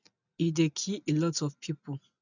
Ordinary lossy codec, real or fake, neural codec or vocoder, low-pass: none; real; none; 7.2 kHz